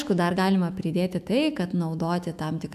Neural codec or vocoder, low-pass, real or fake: autoencoder, 48 kHz, 128 numbers a frame, DAC-VAE, trained on Japanese speech; 14.4 kHz; fake